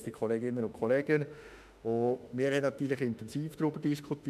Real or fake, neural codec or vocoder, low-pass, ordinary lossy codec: fake; autoencoder, 48 kHz, 32 numbers a frame, DAC-VAE, trained on Japanese speech; 14.4 kHz; none